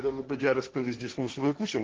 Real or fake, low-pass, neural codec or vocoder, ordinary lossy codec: fake; 7.2 kHz; codec, 16 kHz, 1.1 kbps, Voila-Tokenizer; Opus, 16 kbps